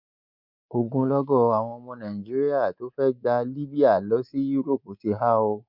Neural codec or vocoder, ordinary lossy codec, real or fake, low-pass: codec, 24 kHz, 3.1 kbps, DualCodec; none; fake; 5.4 kHz